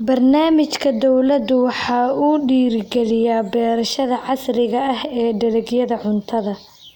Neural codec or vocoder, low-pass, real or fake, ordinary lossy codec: none; 19.8 kHz; real; none